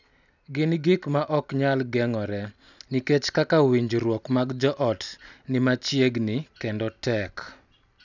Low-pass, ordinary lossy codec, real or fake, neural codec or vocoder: 7.2 kHz; none; real; none